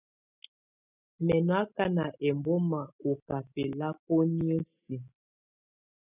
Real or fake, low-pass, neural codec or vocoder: real; 3.6 kHz; none